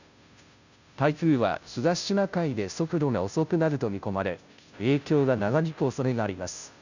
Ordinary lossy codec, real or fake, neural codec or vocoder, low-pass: none; fake; codec, 16 kHz, 0.5 kbps, FunCodec, trained on Chinese and English, 25 frames a second; 7.2 kHz